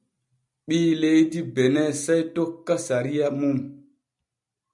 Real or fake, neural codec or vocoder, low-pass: real; none; 10.8 kHz